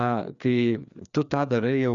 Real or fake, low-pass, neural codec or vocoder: fake; 7.2 kHz; codec, 16 kHz, 2 kbps, FreqCodec, larger model